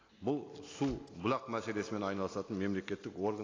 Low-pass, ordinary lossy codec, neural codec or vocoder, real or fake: 7.2 kHz; AAC, 32 kbps; none; real